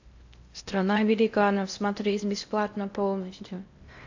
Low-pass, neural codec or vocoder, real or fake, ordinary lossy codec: 7.2 kHz; codec, 16 kHz in and 24 kHz out, 0.6 kbps, FocalCodec, streaming, 4096 codes; fake; AAC, 48 kbps